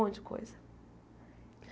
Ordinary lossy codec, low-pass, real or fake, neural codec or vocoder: none; none; real; none